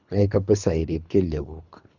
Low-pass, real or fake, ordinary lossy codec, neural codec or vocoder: 7.2 kHz; fake; none; codec, 24 kHz, 3 kbps, HILCodec